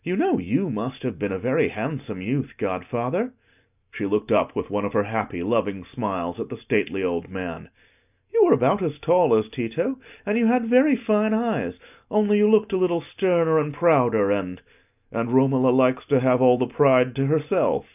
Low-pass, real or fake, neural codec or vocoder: 3.6 kHz; real; none